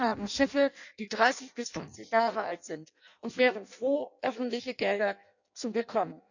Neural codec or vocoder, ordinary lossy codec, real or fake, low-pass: codec, 16 kHz in and 24 kHz out, 0.6 kbps, FireRedTTS-2 codec; none; fake; 7.2 kHz